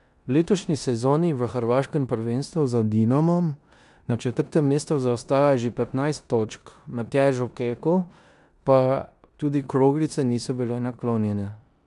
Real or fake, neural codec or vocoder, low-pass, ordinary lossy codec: fake; codec, 16 kHz in and 24 kHz out, 0.9 kbps, LongCat-Audio-Codec, four codebook decoder; 10.8 kHz; none